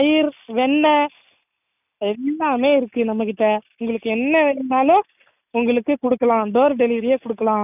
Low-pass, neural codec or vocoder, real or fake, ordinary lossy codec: 3.6 kHz; none; real; none